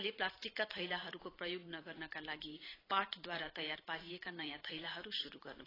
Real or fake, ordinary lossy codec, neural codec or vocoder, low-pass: fake; AAC, 24 kbps; vocoder, 44.1 kHz, 128 mel bands every 512 samples, BigVGAN v2; 5.4 kHz